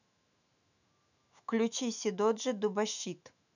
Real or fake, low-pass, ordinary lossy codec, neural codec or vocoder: fake; 7.2 kHz; none; autoencoder, 48 kHz, 128 numbers a frame, DAC-VAE, trained on Japanese speech